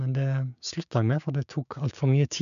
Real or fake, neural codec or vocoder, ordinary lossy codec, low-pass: fake; codec, 16 kHz, 6 kbps, DAC; none; 7.2 kHz